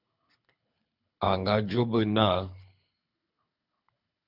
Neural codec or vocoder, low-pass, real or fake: codec, 24 kHz, 6 kbps, HILCodec; 5.4 kHz; fake